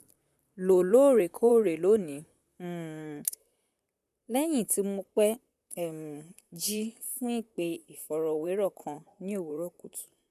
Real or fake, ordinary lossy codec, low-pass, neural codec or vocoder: fake; none; 14.4 kHz; vocoder, 44.1 kHz, 128 mel bands, Pupu-Vocoder